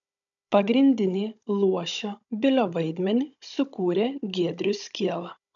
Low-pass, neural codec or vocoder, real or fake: 7.2 kHz; codec, 16 kHz, 16 kbps, FunCodec, trained on Chinese and English, 50 frames a second; fake